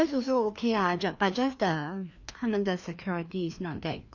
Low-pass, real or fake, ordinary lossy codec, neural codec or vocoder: 7.2 kHz; fake; none; codec, 16 kHz, 2 kbps, FreqCodec, larger model